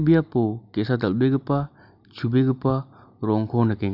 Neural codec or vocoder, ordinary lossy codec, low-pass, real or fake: none; none; 5.4 kHz; real